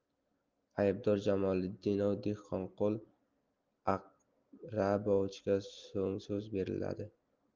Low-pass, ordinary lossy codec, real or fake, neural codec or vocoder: 7.2 kHz; Opus, 24 kbps; real; none